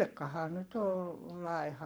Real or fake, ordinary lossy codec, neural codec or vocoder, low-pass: fake; none; codec, 44.1 kHz, 7.8 kbps, Pupu-Codec; none